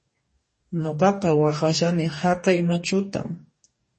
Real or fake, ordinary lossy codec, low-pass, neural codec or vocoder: fake; MP3, 32 kbps; 10.8 kHz; codec, 44.1 kHz, 2.6 kbps, DAC